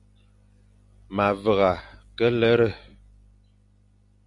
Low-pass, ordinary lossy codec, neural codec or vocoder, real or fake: 10.8 kHz; MP3, 96 kbps; none; real